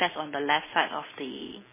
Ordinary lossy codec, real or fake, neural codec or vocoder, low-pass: MP3, 16 kbps; real; none; 3.6 kHz